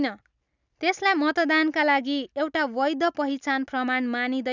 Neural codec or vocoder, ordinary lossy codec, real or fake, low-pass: none; none; real; 7.2 kHz